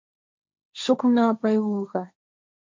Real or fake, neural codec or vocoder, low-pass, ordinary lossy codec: fake; codec, 16 kHz, 1.1 kbps, Voila-Tokenizer; 7.2 kHz; MP3, 64 kbps